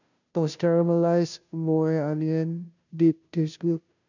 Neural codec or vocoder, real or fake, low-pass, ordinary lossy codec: codec, 16 kHz, 0.5 kbps, FunCodec, trained on Chinese and English, 25 frames a second; fake; 7.2 kHz; none